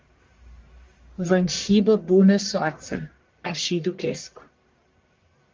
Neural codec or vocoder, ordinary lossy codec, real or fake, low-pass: codec, 44.1 kHz, 1.7 kbps, Pupu-Codec; Opus, 32 kbps; fake; 7.2 kHz